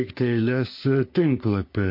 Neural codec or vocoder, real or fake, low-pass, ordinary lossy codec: codec, 44.1 kHz, 3.4 kbps, Pupu-Codec; fake; 5.4 kHz; MP3, 32 kbps